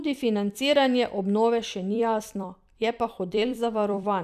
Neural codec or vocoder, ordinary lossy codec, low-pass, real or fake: vocoder, 44.1 kHz, 128 mel bands every 256 samples, BigVGAN v2; none; 14.4 kHz; fake